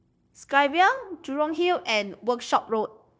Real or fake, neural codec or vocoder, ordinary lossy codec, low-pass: fake; codec, 16 kHz, 0.9 kbps, LongCat-Audio-Codec; none; none